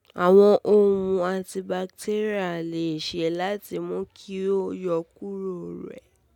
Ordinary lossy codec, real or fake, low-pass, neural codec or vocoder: none; real; 19.8 kHz; none